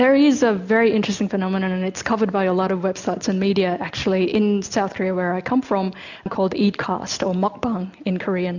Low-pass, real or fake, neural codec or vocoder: 7.2 kHz; real; none